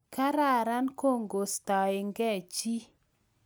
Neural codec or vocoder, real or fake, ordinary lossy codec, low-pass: none; real; none; none